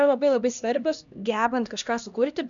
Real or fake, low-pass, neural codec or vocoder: fake; 7.2 kHz; codec, 16 kHz, 1 kbps, X-Codec, HuBERT features, trained on LibriSpeech